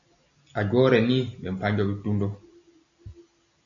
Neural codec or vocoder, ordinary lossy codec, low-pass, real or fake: none; MP3, 96 kbps; 7.2 kHz; real